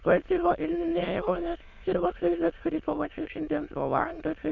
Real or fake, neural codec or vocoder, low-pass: fake; autoencoder, 22.05 kHz, a latent of 192 numbers a frame, VITS, trained on many speakers; 7.2 kHz